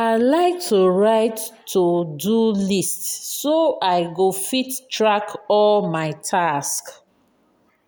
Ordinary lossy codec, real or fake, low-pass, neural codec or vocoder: none; real; none; none